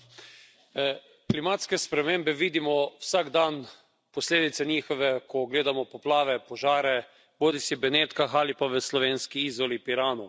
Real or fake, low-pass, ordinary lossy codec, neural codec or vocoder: real; none; none; none